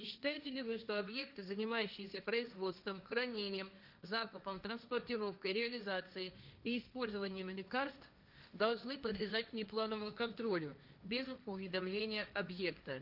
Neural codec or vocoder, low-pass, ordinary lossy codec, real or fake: codec, 16 kHz, 1.1 kbps, Voila-Tokenizer; 5.4 kHz; none; fake